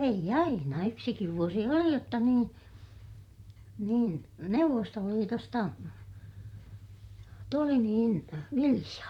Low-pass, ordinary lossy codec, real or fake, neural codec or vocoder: 19.8 kHz; none; fake; vocoder, 44.1 kHz, 128 mel bands, Pupu-Vocoder